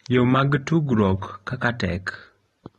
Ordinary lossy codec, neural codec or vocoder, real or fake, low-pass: AAC, 32 kbps; none; real; 19.8 kHz